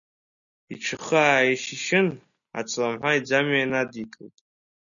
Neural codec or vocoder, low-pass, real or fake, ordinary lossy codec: none; 7.2 kHz; real; MP3, 96 kbps